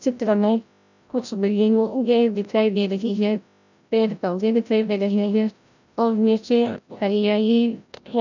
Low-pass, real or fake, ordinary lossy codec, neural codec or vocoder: 7.2 kHz; fake; none; codec, 16 kHz, 0.5 kbps, FreqCodec, larger model